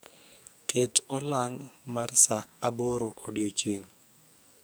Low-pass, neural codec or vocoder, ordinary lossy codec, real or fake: none; codec, 44.1 kHz, 2.6 kbps, SNAC; none; fake